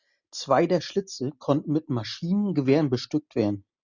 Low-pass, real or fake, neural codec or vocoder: 7.2 kHz; real; none